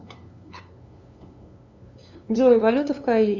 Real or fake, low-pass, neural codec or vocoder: fake; 7.2 kHz; codec, 16 kHz, 2 kbps, FunCodec, trained on LibriTTS, 25 frames a second